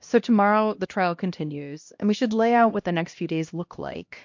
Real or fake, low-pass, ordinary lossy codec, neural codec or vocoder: fake; 7.2 kHz; MP3, 48 kbps; codec, 16 kHz, 1 kbps, X-Codec, HuBERT features, trained on LibriSpeech